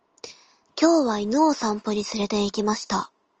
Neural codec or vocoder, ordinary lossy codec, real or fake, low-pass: none; Opus, 24 kbps; real; 7.2 kHz